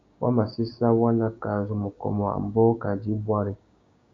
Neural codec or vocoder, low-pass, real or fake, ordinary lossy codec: codec, 16 kHz, 6 kbps, DAC; 7.2 kHz; fake; MP3, 48 kbps